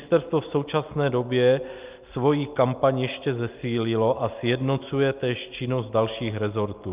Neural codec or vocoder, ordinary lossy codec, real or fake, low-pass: none; Opus, 24 kbps; real; 3.6 kHz